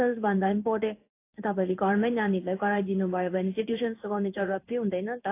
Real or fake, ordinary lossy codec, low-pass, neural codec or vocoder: fake; AAC, 24 kbps; 3.6 kHz; codec, 16 kHz in and 24 kHz out, 1 kbps, XY-Tokenizer